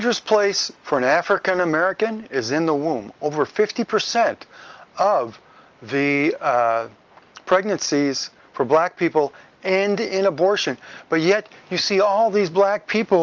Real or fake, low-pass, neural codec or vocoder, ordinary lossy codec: real; 7.2 kHz; none; Opus, 24 kbps